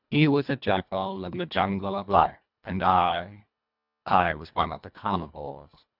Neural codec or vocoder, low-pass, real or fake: codec, 24 kHz, 1.5 kbps, HILCodec; 5.4 kHz; fake